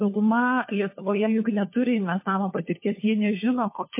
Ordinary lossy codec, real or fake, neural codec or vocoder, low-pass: MP3, 24 kbps; fake; codec, 16 kHz, 4 kbps, FunCodec, trained on LibriTTS, 50 frames a second; 3.6 kHz